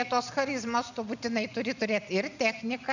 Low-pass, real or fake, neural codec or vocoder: 7.2 kHz; real; none